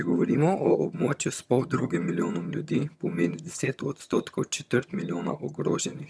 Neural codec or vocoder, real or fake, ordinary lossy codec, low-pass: vocoder, 22.05 kHz, 80 mel bands, HiFi-GAN; fake; none; none